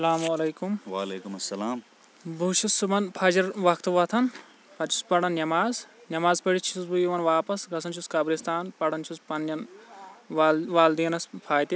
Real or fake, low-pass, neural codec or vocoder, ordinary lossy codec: real; none; none; none